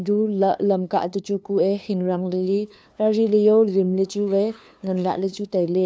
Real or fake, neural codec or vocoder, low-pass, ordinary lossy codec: fake; codec, 16 kHz, 2 kbps, FunCodec, trained on LibriTTS, 25 frames a second; none; none